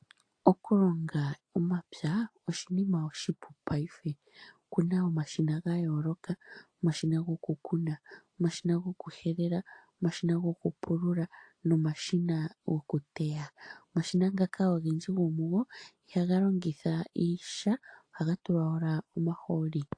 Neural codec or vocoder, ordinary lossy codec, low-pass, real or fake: none; AAC, 48 kbps; 9.9 kHz; real